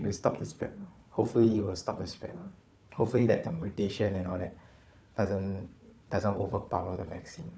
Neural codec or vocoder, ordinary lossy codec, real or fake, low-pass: codec, 16 kHz, 4 kbps, FunCodec, trained on Chinese and English, 50 frames a second; none; fake; none